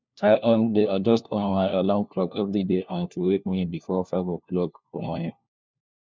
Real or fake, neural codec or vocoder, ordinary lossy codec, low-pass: fake; codec, 16 kHz, 1 kbps, FunCodec, trained on LibriTTS, 50 frames a second; MP3, 64 kbps; 7.2 kHz